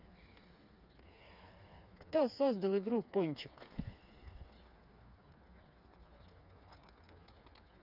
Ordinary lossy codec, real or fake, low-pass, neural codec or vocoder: none; fake; 5.4 kHz; codec, 16 kHz, 4 kbps, FreqCodec, smaller model